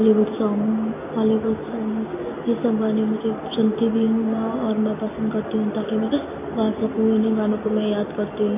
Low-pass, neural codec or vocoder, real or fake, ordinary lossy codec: 3.6 kHz; none; real; MP3, 24 kbps